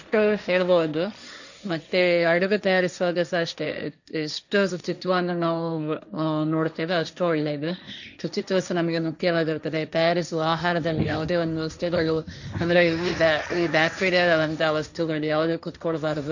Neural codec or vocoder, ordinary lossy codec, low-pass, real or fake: codec, 16 kHz, 1.1 kbps, Voila-Tokenizer; none; 7.2 kHz; fake